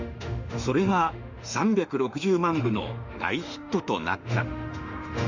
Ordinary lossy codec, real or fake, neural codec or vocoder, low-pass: Opus, 64 kbps; fake; autoencoder, 48 kHz, 32 numbers a frame, DAC-VAE, trained on Japanese speech; 7.2 kHz